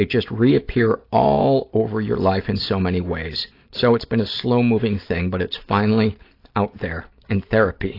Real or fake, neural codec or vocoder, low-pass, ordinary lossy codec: real; none; 5.4 kHz; AAC, 32 kbps